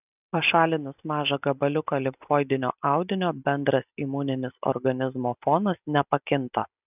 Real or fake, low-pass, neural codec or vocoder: real; 3.6 kHz; none